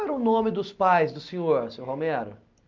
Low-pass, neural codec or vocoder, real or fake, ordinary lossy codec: 7.2 kHz; none; real; Opus, 24 kbps